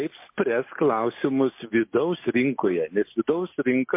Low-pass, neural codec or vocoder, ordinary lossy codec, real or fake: 3.6 kHz; none; MP3, 24 kbps; real